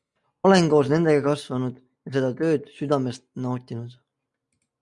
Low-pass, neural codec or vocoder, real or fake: 10.8 kHz; none; real